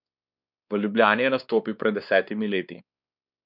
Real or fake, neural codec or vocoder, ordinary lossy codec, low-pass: fake; codec, 16 kHz, 2 kbps, X-Codec, WavLM features, trained on Multilingual LibriSpeech; none; 5.4 kHz